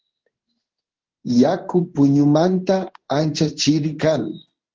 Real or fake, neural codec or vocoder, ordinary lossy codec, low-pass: fake; codec, 16 kHz in and 24 kHz out, 1 kbps, XY-Tokenizer; Opus, 16 kbps; 7.2 kHz